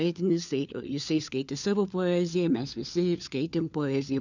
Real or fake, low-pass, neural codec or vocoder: fake; 7.2 kHz; codec, 16 kHz, 4 kbps, FunCodec, trained on LibriTTS, 50 frames a second